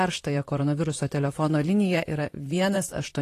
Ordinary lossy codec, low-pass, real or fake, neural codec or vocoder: AAC, 48 kbps; 14.4 kHz; fake; vocoder, 44.1 kHz, 128 mel bands, Pupu-Vocoder